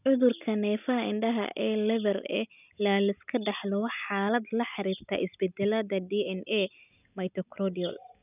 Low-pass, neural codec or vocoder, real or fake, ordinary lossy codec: 3.6 kHz; none; real; none